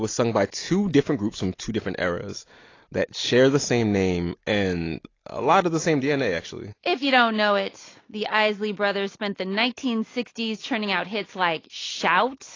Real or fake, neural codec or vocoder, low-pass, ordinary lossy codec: real; none; 7.2 kHz; AAC, 32 kbps